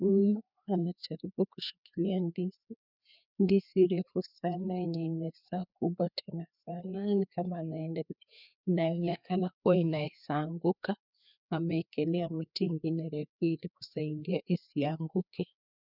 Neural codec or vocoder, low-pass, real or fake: codec, 16 kHz, 2 kbps, FreqCodec, larger model; 5.4 kHz; fake